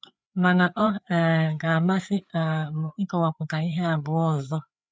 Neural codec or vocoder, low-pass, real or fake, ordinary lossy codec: codec, 16 kHz, 4 kbps, FreqCodec, larger model; none; fake; none